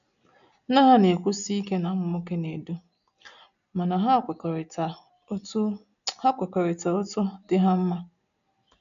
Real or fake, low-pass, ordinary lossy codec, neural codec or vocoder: real; 7.2 kHz; none; none